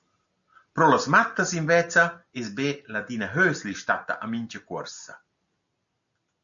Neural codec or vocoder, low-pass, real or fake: none; 7.2 kHz; real